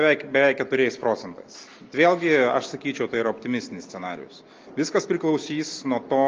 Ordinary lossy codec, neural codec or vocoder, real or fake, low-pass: Opus, 24 kbps; none; real; 7.2 kHz